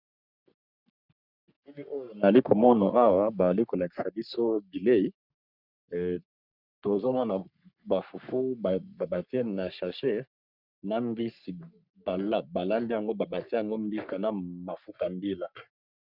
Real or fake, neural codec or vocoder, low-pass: fake; codec, 44.1 kHz, 3.4 kbps, Pupu-Codec; 5.4 kHz